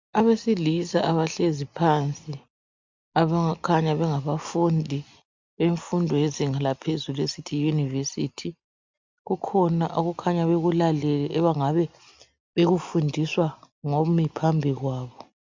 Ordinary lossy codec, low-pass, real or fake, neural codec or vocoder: MP3, 64 kbps; 7.2 kHz; real; none